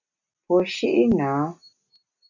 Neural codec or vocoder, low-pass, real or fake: none; 7.2 kHz; real